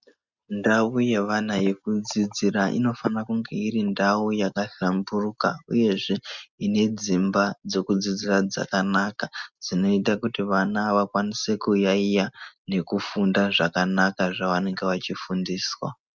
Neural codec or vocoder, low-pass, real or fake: none; 7.2 kHz; real